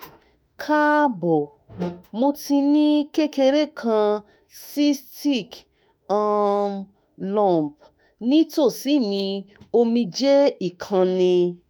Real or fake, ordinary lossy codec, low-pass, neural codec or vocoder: fake; none; none; autoencoder, 48 kHz, 32 numbers a frame, DAC-VAE, trained on Japanese speech